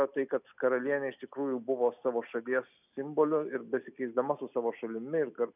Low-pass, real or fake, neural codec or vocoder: 3.6 kHz; real; none